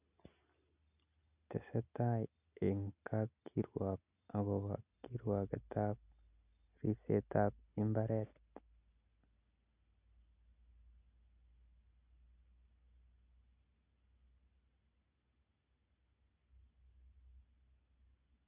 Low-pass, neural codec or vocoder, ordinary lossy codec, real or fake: 3.6 kHz; none; none; real